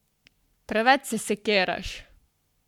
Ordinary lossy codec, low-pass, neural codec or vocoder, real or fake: none; 19.8 kHz; codec, 44.1 kHz, 7.8 kbps, Pupu-Codec; fake